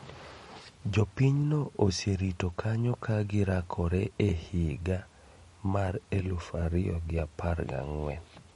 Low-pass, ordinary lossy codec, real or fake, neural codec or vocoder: 19.8 kHz; MP3, 48 kbps; real; none